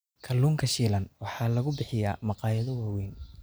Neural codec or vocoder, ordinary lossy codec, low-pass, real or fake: none; none; none; real